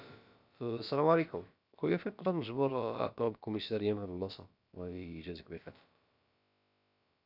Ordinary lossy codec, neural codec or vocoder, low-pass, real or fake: none; codec, 16 kHz, about 1 kbps, DyCAST, with the encoder's durations; 5.4 kHz; fake